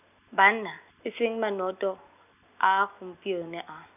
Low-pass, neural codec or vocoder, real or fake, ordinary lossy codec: 3.6 kHz; none; real; none